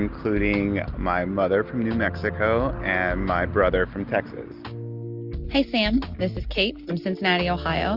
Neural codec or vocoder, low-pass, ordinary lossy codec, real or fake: none; 5.4 kHz; Opus, 32 kbps; real